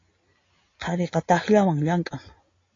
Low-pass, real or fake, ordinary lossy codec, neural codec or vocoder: 7.2 kHz; real; AAC, 32 kbps; none